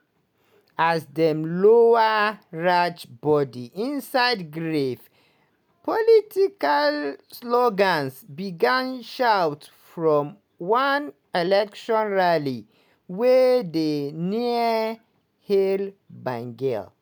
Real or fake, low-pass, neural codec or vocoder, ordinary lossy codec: real; 19.8 kHz; none; none